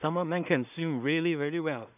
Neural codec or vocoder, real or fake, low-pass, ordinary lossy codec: codec, 16 kHz in and 24 kHz out, 0.4 kbps, LongCat-Audio-Codec, two codebook decoder; fake; 3.6 kHz; none